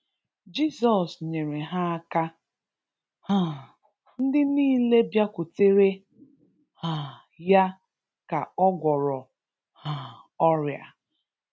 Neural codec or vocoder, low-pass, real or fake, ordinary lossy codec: none; none; real; none